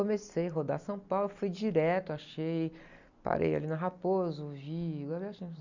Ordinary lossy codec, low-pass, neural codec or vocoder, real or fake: none; 7.2 kHz; none; real